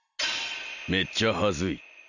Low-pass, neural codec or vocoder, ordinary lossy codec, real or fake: 7.2 kHz; none; none; real